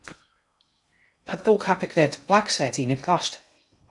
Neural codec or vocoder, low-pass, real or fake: codec, 16 kHz in and 24 kHz out, 0.6 kbps, FocalCodec, streaming, 2048 codes; 10.8 kHz; fake